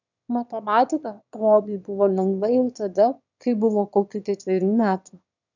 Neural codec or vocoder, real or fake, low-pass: autoencoder, 22.05 kHz, a latent of 192 numbers a frame, VITS, trained on one speaker; fake; 7.2 kHz